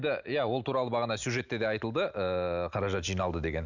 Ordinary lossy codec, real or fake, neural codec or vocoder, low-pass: none; real; none; 7.2 kHz